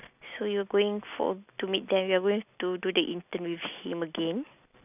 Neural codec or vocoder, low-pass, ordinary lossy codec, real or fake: none; 3.6 kHz; none; real